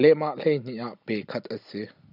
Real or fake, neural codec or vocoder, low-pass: real; none; 5.4 kHz